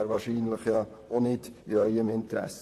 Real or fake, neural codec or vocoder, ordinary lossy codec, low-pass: fake; vocoder, 44.1 kHz, 128 mel bands, Pupu-Vocoder; AAC, 64 kbps; 14.4 kHz